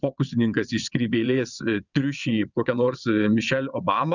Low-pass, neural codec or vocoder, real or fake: 7.2 kHz; vocoder, 22.05 kHz, 80 mel bands, WaveNeXt; fake